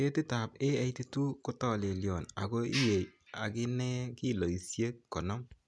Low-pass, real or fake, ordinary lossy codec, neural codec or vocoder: none; real; none; none